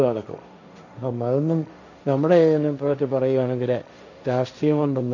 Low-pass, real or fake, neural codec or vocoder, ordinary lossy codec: 7.2 kHz; fake; codec, 16 kHz, 1.1 kbps, Voila-Tokenizer; none